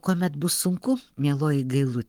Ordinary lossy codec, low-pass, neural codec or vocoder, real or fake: Opus, 24 kbps; 19.8 kHz; codec, 44.1 kHz, 7.8 kbps, Pupu-Codec; fake